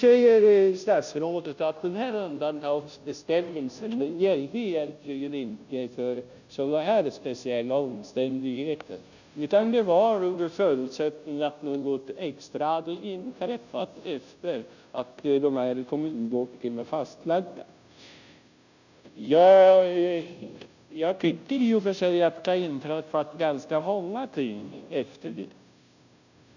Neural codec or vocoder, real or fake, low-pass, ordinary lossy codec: codec, 16 kHz, 0.5 kbps, FunCodec, trained on Chinese and English, 25 frames a second; fake; 7.2 kHz; none